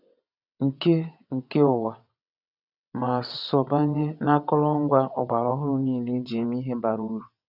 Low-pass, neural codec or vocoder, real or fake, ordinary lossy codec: 5.4 kHz; vocoder, 22.05 kHz, 80 mel bands, WaveNeXt; fake; none